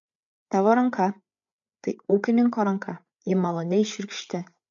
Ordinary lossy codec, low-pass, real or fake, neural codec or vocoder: MP3, 48 kbps; 7.2 kHz; fake; codec, 16 kHz, 8 kbps, FreqCodec, larger model